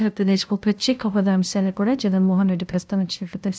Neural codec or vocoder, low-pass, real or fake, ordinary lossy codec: codec, 16 kHz, 0.5 kbps, FunCodec, trained on LibriTTS, 25 frames a second; none; fake; none